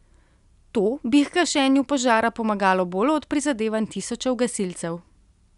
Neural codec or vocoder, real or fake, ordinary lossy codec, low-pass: none; real; none; 10.8 kHz